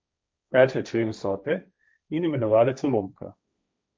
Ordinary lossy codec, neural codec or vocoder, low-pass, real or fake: none; codec, 16 kHz, 1.1 kbps, Voila-Tokenizer; none; fake